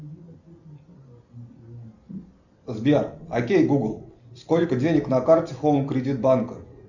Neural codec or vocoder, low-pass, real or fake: none; 7.2 kHz; real